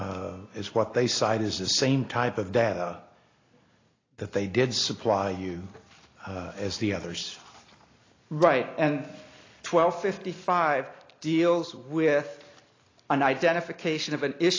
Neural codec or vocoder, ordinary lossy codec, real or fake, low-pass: none; AAC, 32 kbps; real; 7.2 kHz